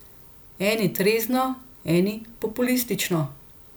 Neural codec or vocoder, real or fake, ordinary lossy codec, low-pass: none; real; none; none